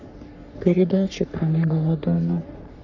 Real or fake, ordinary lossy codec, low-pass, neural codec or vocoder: fake; none; 7.2 kHz; codec, 44.1 kHz, 3.4 kbps, Pupu-Codec